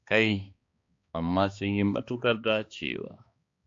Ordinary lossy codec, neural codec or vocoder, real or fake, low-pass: AAC, 48 kbps; codec, 16 kHz, 2 kbps, X-Codec, HuBERT features, trained on balanced general audio; fake; 7.2 kHz